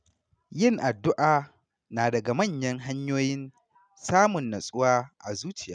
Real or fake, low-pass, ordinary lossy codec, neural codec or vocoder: real; 9.9 kHz; none; none